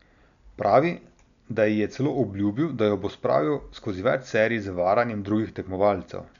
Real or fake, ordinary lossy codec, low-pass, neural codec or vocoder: real; none; 7.2 kHz; none